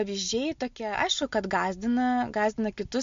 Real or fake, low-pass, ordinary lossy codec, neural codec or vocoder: real; 7.2 kHz; MP3, 48 kbps; none